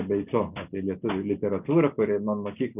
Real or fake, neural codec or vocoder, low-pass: real; none; 3.6 kHz